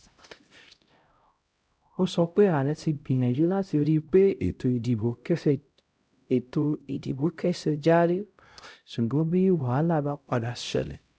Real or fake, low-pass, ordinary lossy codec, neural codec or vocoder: fake; none; none; codec, 16 kHz, 0.5 kbps, X-Codec, HuBERT features, trained on LibriSpeech